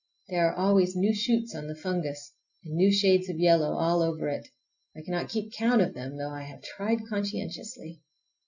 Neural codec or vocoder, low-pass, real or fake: none; 7.2 kHz; real